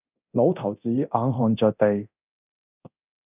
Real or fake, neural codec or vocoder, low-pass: fake; codec, 24 kHz, 0.9 kbps, DualCodec; 3.6 kHz